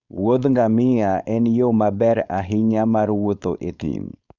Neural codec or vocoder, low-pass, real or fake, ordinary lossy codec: codec, 16 kHz, 4.8 kbps, FACodec; 7.2 kHz; fake; none